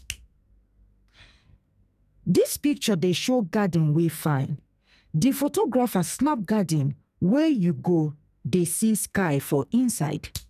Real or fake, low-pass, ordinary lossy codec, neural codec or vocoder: fake; 14.4 kHz; none; codec, 44.1 kHz, 2.6 kbps, SNAC